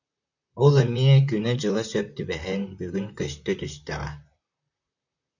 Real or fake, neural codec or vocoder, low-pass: fake; vocoder, 44.1 kHz, 128 mel bands, Pupu-Vocoder; 7.2 kHz